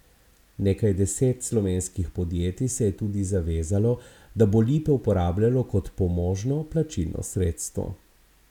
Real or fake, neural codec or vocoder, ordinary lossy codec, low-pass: real; none; none; 19.8 kHz